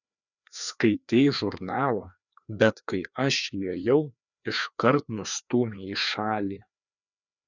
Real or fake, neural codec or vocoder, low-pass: fake; codec, 16 kHz, 2 kbps, FreqCodec, larger model; 7.2 kHz